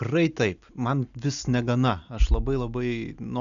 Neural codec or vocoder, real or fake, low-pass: none; real; 7.2 kHz